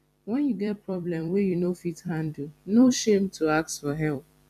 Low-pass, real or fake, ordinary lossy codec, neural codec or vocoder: 14.4 kHz; fake; none; vocoder, 44.1 kHz, 128 mel bands every 256 samples, BigVGAN v2